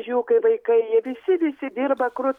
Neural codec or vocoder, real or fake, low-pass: none; real; 19.8 kHz